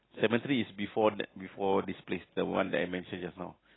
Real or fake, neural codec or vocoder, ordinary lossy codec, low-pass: real; none; AAC, 16 kbps; 7.2 kHz